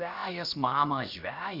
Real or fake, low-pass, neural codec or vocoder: fake; 5.4 kHz; codec, 16 kHz, 0.7 kbps, FocalCodec